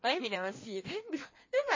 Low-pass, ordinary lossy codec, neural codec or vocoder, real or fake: 7.2 kHz; MP3, 32 kbps; codec, 16 kHz in and 24 kHz out, 1.1 kbps, FireRedTTS-2 codec; fake